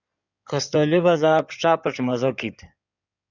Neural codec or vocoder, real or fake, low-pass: codec, 16 kHz in and 24 kHz out, 2.2 kbps, FireRedTTS-2 codec; fake; 7.2 kHz